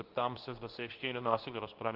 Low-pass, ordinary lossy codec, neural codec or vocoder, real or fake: 5.4 kHz; Opus, 32 kbps; codec, 24 kHz, 0.9 kbps, WavTokenizer, medium speech release version 2; fake